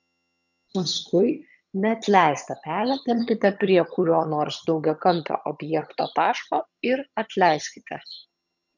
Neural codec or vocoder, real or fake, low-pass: vocoder, 22.05 kHz, 80 mel bands, HiFi-GAN; fake; 7.2 kHz